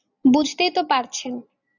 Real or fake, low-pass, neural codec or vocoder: real; 7.2 kHz; none